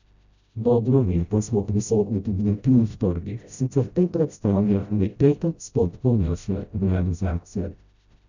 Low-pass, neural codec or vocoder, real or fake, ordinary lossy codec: 7.2 kHz; codec, 16 kHz, 0.5 kbps, FreqCodec, smaller model; fake; none